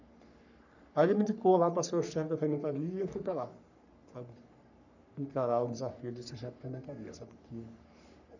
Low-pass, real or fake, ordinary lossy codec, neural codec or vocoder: 7.2 kHz; fake; none; codec, 44.1 kHz, 3.4 kbps, Pupu-Codec